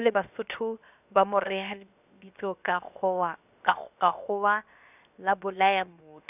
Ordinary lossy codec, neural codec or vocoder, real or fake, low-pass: none; codec, 16 kHz, 0.7 kbps, FocalCodec; fake; 3.6 kHz